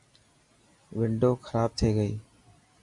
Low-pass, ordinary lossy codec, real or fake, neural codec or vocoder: 10.8 kHz; Opus, 64 kbps; real; none